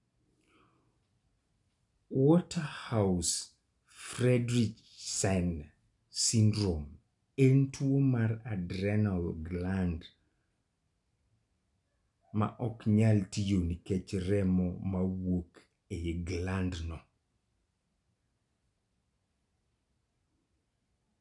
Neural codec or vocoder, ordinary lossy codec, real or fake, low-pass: none; none; real; 10.8 kHz